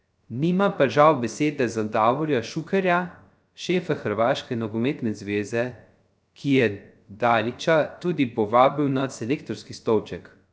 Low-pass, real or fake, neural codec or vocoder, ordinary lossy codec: none; fake; codec, 16 kHz, 0.3 kbps, FocalCodec; none